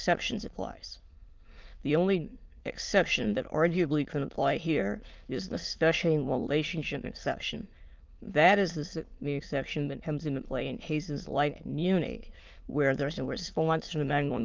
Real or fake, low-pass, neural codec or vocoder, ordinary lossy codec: fake; 7.2 kHz; autoencoder, 22.05 kHz, a latent of 192 numbers a frame, VITS, trained on many speakers; Opus, 24 kbps